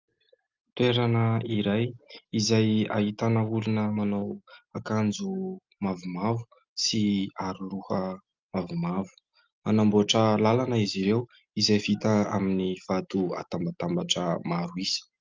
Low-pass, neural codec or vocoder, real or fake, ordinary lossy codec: 7.2 kHz; none; real; Opus, 24 kbps